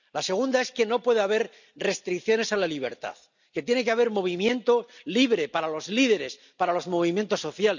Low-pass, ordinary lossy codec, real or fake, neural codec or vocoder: 7.2 kHz; none; real; none